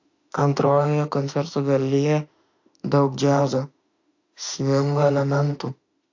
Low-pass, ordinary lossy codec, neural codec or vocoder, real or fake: 7.2 kHz; AAC, 48 kbps; autoencoder, 48 kHz, 32 numbers a frame, DAC-VAE, trained on Japanese speech; fake